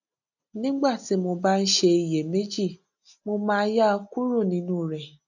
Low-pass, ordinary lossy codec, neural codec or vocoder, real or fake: 7.2 kHz; none; none; real